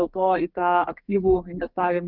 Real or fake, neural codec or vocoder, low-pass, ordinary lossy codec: fake; codec, 32 kHz, 1.9 kbps, SNAC; 5.4 kHz; Opus, 32 kbps